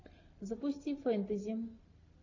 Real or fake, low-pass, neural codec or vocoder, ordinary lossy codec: real; 7.2 kHz; none; AAC, 48 kbps